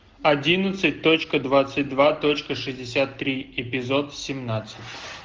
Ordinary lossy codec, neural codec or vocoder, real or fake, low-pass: Opus, 16 kbps; none; real; 7.2 kHz